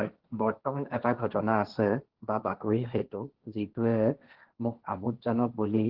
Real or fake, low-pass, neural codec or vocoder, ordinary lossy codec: fake; 5.4 kHz; codec, 16 kHz, 1.1 kbps, Voila-Tokenizer; Opus, 16 kbps